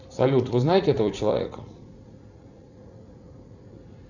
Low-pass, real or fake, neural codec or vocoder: 7.2 kHz; fake; vocoder, 44.1 kHz, 80 mel bands, Vocos